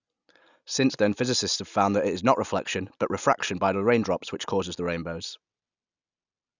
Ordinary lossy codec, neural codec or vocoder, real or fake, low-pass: none; none; real; 7.2 kHz